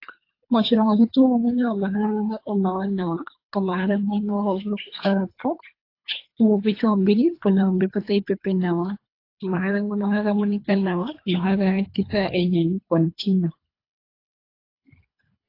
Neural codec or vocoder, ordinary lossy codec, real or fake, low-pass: codec, 24 kHz, 3 kbps, HILCodec; AAC, 32 kbps; fake; 5.4 kHz